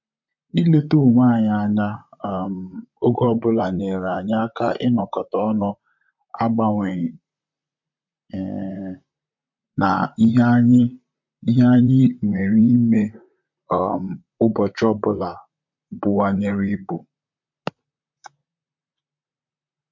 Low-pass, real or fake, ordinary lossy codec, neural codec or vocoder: 7.2 kHz; fake; MP3, 48 kbps; vocoder, 44.1 kHz, 128 mel bands, Pupu-Vocoder